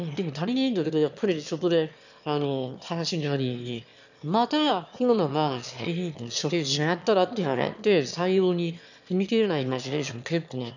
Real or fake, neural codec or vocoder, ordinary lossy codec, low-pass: fake; autoencoder, 22.05 kHz, a latent of 192 numbers a frame, VITS, trained on one speaker; none; 7.2 kHz